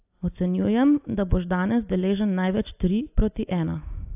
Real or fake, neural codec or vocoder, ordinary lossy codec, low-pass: real; none; AAC, 32 kbps; 3.6 kHz